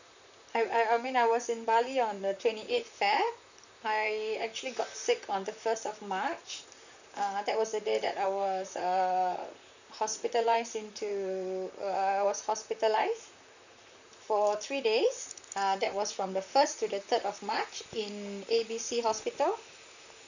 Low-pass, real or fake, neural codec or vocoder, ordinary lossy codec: 7.2 kHz; fake; vocoder, 44.1 kHz, 128 mel bands, Pupu-Vocoder; none